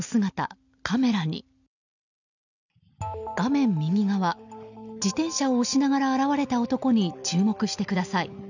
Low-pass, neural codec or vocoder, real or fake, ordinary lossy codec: 7.2 kHz; none; real; none